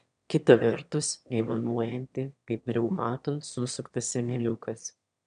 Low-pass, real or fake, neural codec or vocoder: 9.9 kHz; fake; autoencoder, 22.05 kHz, a latent of 192 numbers a frame, VITS, trained on one speaker